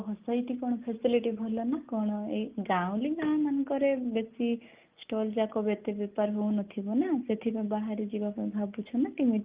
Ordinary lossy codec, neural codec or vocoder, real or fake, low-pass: Opus, 24 kbps; none; real; 3.6 kHz